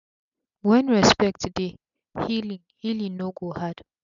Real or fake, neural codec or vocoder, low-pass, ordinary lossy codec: real; none; 7.2 kHz; none